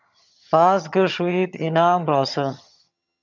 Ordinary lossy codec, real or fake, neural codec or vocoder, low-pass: MP3, 64 kbps; fake; vocoder, 22.05 kHz, 80 mel bands, HiFi-GAN; 7.2 kHz